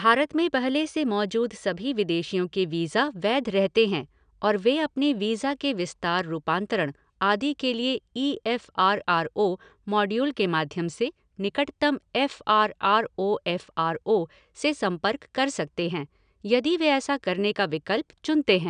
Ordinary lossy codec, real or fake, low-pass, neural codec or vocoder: none; real; 9.9 kHz; none